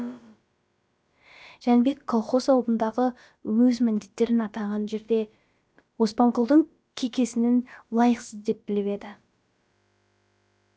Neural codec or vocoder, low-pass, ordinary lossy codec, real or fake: codec, 16 kHz, about 1 kbps, DyCAST, with the encoder's durations; none; none; fake